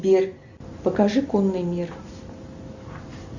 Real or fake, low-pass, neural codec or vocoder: real; 7.2 kHz; none